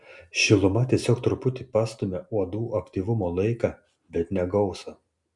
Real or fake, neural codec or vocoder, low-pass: real; none; 10.8 kHz